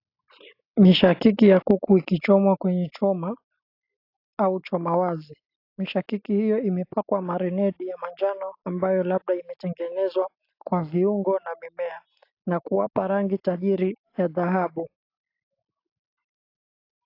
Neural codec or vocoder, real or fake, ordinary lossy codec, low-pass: none; real; AAC, 32 kbps; 5.4 kHz